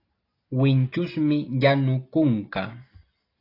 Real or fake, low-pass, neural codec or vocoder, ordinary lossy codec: real; 5.4 kHz; none; AAC, 32 kbps